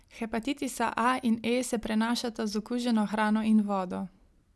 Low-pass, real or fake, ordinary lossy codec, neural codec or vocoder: none; real; none; none